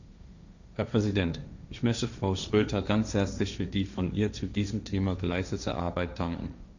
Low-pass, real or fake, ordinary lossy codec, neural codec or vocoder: 7.2 kHz; fake; none; codec, 16 kHz, 1.1 kbps, Voila-Tokenizer